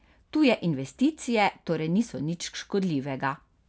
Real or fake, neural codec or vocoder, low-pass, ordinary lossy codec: real; none; none; none